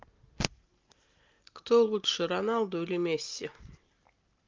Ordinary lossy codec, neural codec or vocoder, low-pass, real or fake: Opus, 32 kbps; none; 7.2 kHz; real